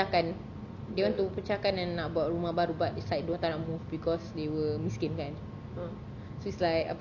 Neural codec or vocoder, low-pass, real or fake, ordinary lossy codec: none; 7.2 kHz; real; Opus, 64 kbps